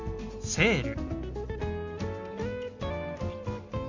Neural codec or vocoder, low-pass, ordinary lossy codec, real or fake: none; 7.2 kHz; Opus, 64 kbps; real